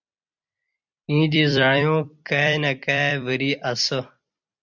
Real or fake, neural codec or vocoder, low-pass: fake; vocoder, 44.1 kHz, 128 mel bands every 256 samples, BigVGAN v2; 7.2 kHz